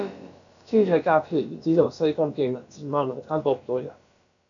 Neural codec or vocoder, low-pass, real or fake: codec, 16 kHz, about 1 kbps, DyCAST, with the encoder's durations; 7.2 kHz; fake